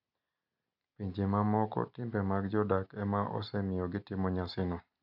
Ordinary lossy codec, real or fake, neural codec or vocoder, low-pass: none; real; none; 5.4 kHz